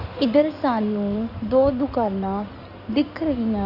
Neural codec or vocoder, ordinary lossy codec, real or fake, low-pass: codec, 16 kHz in and 24 kHz out, 2.2 kbps, FireRedTTS-2 codec; none; fake; 5.4 kHz